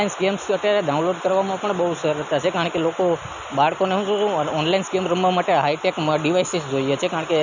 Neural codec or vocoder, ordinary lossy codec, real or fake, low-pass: none; none; real; 7.2 kHz